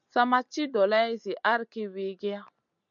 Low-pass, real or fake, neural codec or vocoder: 7.2 kHz; real; none